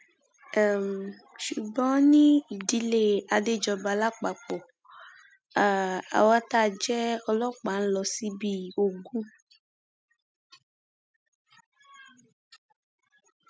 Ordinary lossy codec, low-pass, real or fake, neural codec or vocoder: none; none; real; none